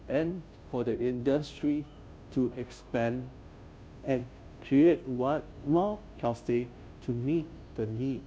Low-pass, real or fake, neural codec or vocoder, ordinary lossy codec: none; fake; codec, 16 kHz, 0.5 kbps, FunCodec, trained on Chinese and English, 25 frames a second; none